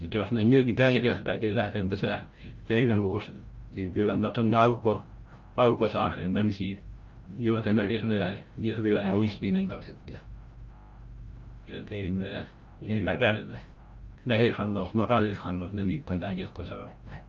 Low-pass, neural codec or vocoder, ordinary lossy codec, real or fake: 7.2 kHz; codec, 16 kHz, 0.5 kbps, FreqCodec, larger model; Opus, 32 kbps; fake